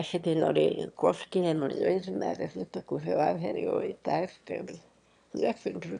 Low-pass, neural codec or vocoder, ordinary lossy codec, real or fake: 9.9 kHz; autoencoder, 22.05 kHz, a latent of 192 numbers a frame, VITS, trained on one speaker; none; fake